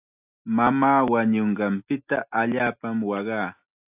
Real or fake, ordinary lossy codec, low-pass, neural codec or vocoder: real; AAC, 32 kbps; 3.6 kHz; none